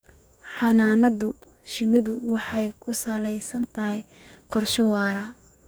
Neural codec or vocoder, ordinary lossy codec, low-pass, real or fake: codec, 44.1 kHz, 2.6 kbps, DAC; none; none; fake